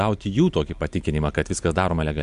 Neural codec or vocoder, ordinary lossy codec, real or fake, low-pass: none; MP3, 64 kbps; real; 10.8 kHz